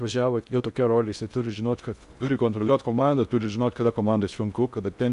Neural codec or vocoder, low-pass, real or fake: codec, 16 kHz in and 24 kHz out, 0.6 kbps, FocalCodec, streaming, 2048 codes; 10.8 kHz; fake